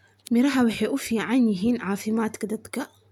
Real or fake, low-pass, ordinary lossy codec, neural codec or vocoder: real; 19.8 kHz; none; none